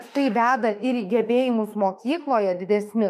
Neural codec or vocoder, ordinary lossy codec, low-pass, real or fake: autoencoder, 48 kHz, 32 numbers a frame, DAC-VAE, trained on Japanese speech; MP3, 96 kbps; 14.4 kHz; fake